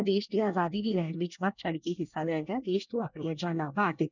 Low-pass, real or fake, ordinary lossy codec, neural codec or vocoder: 7.2 kHz; fake; none; codec, 24 kHz, 1 kbps, SNAC